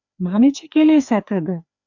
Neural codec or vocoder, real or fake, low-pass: codec, 16 kHz, 2 kbps, FreqCodec, larger model; fake; 7.2 kHz